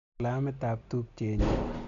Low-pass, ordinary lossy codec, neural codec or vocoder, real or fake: 7.2 kHz; none; none; real